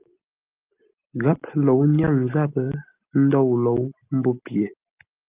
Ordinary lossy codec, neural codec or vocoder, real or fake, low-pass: Opus, 24 kbps; none; real; 3.6 kHz